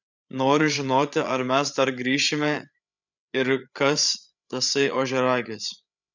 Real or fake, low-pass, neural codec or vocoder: fake; 7.2 kHz; codec, 16 kHz, 8 kbps, FreqCodec, larger model